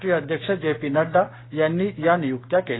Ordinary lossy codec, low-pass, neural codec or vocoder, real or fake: AAC, 16 kbps; 7.2 kHz; codec, 16 kHz, 6 kbps, DAC; fake